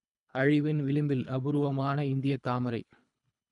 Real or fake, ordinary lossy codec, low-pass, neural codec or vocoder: fake; none; 10.8 kHz; codec, 24 kHz, 3 kbps, HILCodec